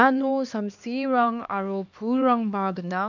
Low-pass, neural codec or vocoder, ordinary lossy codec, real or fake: 7.2 kHz; codec, 16 kHz, 2 kbps, X-Codec, HuBERT features, trained on LibriSpeech; none; fake